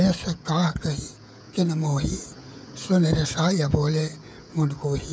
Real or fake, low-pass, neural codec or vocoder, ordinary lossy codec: fake; none; codec, 16 kHz, 16 kbps, FreqCodec, smaller model; none